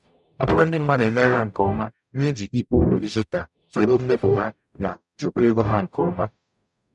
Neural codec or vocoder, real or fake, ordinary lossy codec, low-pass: codec, 44.1 kHz, 0.9 kbps, DAC; fake; none; 10.8 kHz